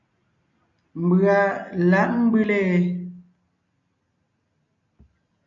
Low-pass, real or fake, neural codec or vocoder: 7.2 kHz; real; none